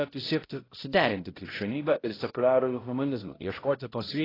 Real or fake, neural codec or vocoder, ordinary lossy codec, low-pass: fake; codec, 16 kHz, 0.5 kbps, X-Codec, HuBERT features, trained on balanced general audio; AAC, 24 kbps; 5.4 kHz